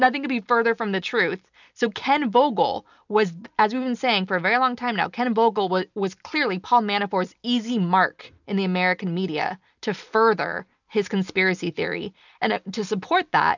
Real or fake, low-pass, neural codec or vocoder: real; 7.2 kHz; none